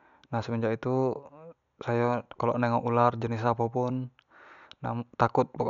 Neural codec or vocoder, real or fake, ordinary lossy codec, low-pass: none; real; none; 7.2 kHz